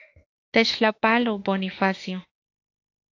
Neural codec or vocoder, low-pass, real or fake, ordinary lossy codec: autoencoder, 48 kHz, 32 numbers a frame, DAC-VAE, trained on Japanese speech; 7.2 kHz; fake; AAC, 48 kbps